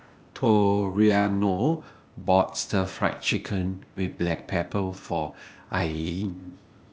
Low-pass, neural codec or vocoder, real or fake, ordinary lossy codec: none; codec, 16 kHz, 0.8 kbps, ZipCodec; fake; none